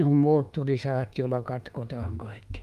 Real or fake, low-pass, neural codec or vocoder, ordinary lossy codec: fake; 14.4 kHz; autoencoder, 48 kHz, 32 numbers a frame, DAC-VAE, trained on Japanese speech; Opus, 32 kbps